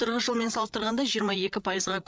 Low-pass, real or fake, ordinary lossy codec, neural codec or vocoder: none; fake; none; codec, 16 kHz, 8 kbps, FreqCodec, larger model